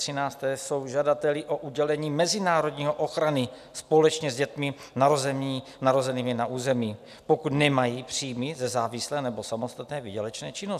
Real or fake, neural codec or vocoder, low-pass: real; none; 14.4 kHz